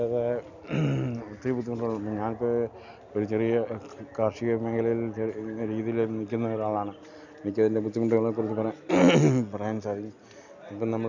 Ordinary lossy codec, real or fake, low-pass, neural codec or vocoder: none; real; 7.2 kHz; none